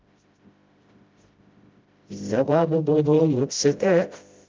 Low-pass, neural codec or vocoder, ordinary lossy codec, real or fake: 7.2 kHz; codec, 16 kHz, 0.5 kbps, FreqCodec, smaller model; Opus, 32 kbps; fake